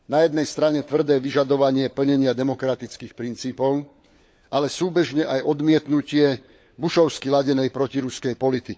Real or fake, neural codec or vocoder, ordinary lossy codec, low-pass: fake; codec, 16 kHz, 4 kbps, FunCodec, trained on LibriTTS, 50 frames a second; none; none